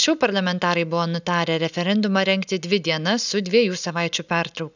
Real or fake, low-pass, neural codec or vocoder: real; 7.2 kHz; none